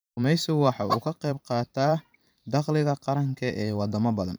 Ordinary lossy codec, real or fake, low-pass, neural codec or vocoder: none; fake; none; vocoder, 44.1 kHz, 128 mel bands every 512 samples, BigVGAN v2